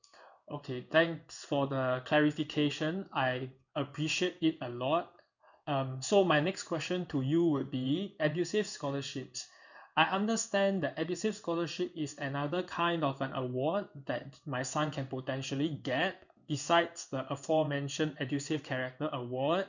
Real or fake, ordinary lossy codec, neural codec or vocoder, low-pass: fake; none; codec, 16 kHz in and 24 kHz out, 1 kbps, XY-Tokenizer; 7.2 kHz